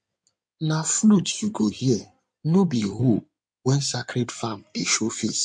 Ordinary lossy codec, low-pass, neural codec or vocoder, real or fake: MP3, 64 kbps; 9.9 kHz; codec, 16 kHz in and 24 kHz out, 2.2 kbps, FireRedTTS-2 codec; fake